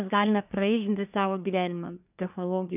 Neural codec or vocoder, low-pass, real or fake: codec, 24 kHz, 1 kbps, SNAC; 3.6 kHz; fake